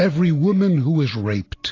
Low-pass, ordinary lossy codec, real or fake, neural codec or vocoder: 7.2 kHz; AAC, 32 kbps; real; none